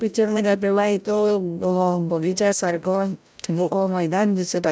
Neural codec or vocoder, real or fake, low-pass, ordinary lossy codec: codec, 16 kHz, 0.5 kbps, FreqCodec, larger model; fake; none; none